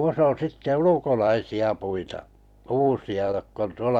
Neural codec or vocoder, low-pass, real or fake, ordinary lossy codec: vocoder, 44.1 kHz, 128 mel bands every 256 samples, BigVGAN v2; 19.8 kHz; fake; none